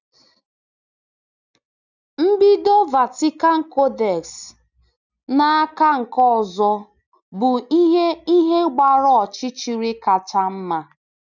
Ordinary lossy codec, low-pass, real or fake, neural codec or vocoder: none; 7.2 kHz; real; none